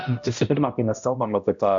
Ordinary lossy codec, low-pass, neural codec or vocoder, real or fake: MP3, 64 kbps; 7.2 kHz; codec, 16 kHz, 0.5 kbps, X-Codec, HuBERT features, trained on balanced general audio; fake